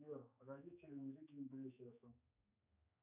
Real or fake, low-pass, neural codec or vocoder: fake; 3.6 kHz; codec, 16 kHz, 4 kbps, X-Codec, HuBERT features, trained on general audio